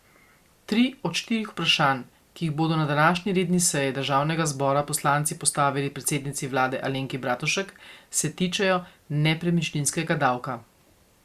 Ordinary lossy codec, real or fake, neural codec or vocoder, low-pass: Opus, 64 kbps; real; none; 14.4 kHz